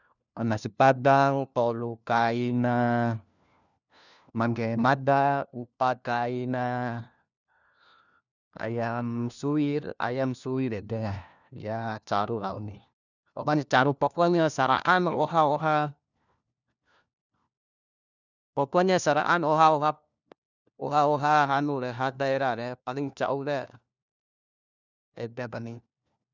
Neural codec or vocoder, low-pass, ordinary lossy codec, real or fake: codec, 16 kHz, 1 kbps, FunCodec, trained on LibriTTS, 50 frames a second; 7.2 kHz; none; fake